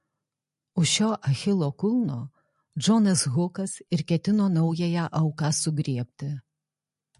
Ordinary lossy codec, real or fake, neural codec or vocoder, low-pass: MP3, 48 kbps; real; none; 14.4 kHz